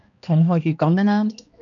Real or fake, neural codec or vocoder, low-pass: fake; codec, 16 kHz, 1 kbps, X-Codec, HuBERT features, trained on balanced general audio; 7.2 kHz